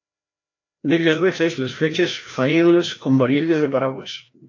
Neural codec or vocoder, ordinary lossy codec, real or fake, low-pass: codec, 16 kHz, 1 kbps, FreqCodec, larger model; AAC, 48 kbps; fake; 7.2 kHz